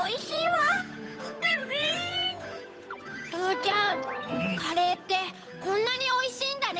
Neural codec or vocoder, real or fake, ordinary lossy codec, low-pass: codec, 16 kHz, 8 kbps, FunCodec, trained on Chinese and English, 25 frames a second; fake; none; none